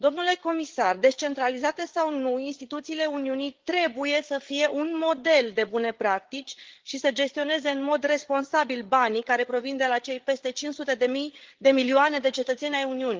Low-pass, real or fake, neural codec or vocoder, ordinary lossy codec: 7.2 kHz; fake; codec, 16 kHz, 8 kbps, FreqCodec, larger model; Opus, 16 kbps